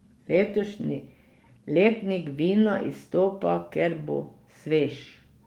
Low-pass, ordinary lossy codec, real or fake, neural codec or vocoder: 19.8 kHz; Opus, 24 kbps; fake; codec, 44.1 kHz, 7.8 kbps, DAC